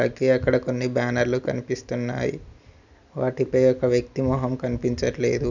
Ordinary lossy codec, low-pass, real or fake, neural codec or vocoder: none; 7.2 kHz; real; none